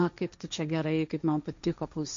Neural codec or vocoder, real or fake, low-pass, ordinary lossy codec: codec, 16 kHz, 1.1 kbps, Voila-Tokenizer; fake; 7.2 kHz; MP3, 48 kbps